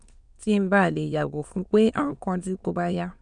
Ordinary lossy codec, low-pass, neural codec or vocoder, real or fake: none; 9.9 kHz; autoencoder, 22.05 kHz, a latent of 192 numbers a frame, VITS, trained on many speakers; fake